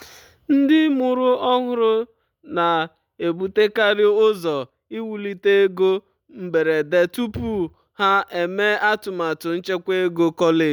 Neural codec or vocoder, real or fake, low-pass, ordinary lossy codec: none; real; 19.8 kHz; none